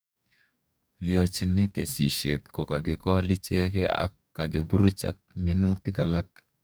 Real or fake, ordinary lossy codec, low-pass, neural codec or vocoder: fake; none; none; codec, 44.1 kHz, 2.6 kbps, DAC